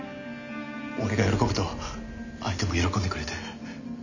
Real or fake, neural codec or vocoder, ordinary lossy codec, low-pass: real; none; none; 7.2 kHz